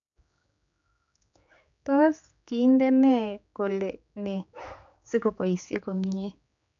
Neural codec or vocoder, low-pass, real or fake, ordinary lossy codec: codec, 16 kHz, 4 kbps, X-Codec, HuBERT features, trained on general audio; 7.2 kHz; fake; MP3, 64 kbps